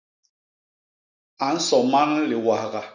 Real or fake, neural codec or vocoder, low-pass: real; none; 7.2 kHz